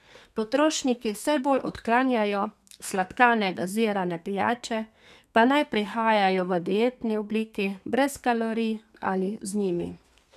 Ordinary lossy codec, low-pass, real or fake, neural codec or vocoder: none; 14.4 kHz; fake; codec, 32 kHz, 1.9 kbps, SNAC